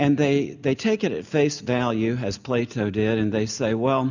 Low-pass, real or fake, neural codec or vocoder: 7.2 kHz; real; none